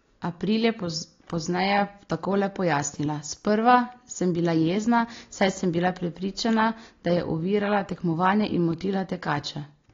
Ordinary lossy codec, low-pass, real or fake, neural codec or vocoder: AAC, 32 kbps; 7.2 kHz; real; none